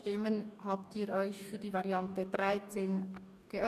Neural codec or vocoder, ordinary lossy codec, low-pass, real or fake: codec, 44.1 kHz, 2.6 kbps, DAC; none; 14.4 kHz; fake